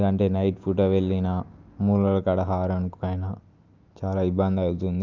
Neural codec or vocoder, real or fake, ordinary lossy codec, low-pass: none; real; Opus, 24 kbps; 7.2 kHz